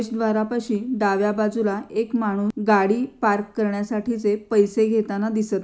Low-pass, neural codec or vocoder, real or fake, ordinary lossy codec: none; none; real; none